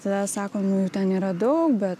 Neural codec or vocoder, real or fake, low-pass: none; real; 14.4 kHz